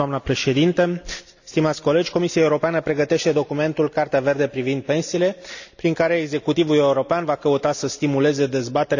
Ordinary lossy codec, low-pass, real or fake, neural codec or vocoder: none; 7.2 kHz; real; none